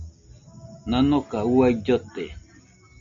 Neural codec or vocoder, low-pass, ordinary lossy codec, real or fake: none; 7.2 kHz; MP3, 48 kbps; real